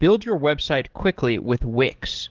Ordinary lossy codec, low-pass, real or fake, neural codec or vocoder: Opus, 16 kbps; 7.2 kHz; fake; codec, 44.1 kHz, 7.8 kbps, DAC